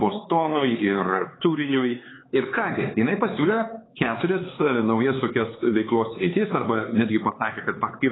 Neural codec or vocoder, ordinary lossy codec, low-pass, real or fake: codec, 16 kHz, 4 kbps, X-Codec, HuBERT features, trained on LibriSpeech; AAC, 16 kbps; 7.2 kHz; fake